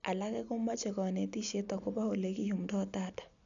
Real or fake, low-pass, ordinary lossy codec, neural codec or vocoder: real; 7.2 kHz; none; none